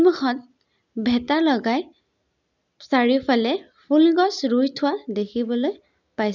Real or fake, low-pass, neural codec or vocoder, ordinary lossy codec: real; 7.2 kHz; none; none